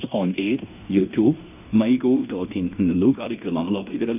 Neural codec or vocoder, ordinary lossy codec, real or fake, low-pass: codec, 16 kHz in and 24 kHz out, 0.9 kbps, LongCat-Audio-Codec, fine tuned four codebook decoder; none; fake; 3.6 kHz